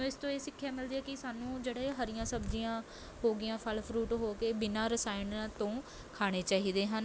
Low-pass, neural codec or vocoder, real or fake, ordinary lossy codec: none; none; real; none